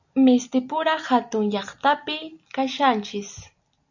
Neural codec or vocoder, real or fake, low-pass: none; real; 7.2 kHz